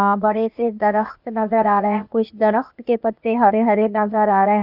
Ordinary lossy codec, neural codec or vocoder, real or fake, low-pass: none; codec, 16 kHz, 0.8 kbps, ZipCodec; fake; 5.4 kHz